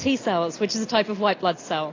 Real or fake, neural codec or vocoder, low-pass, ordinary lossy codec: real; none; 7.2 kHz; AAC, 32 kbps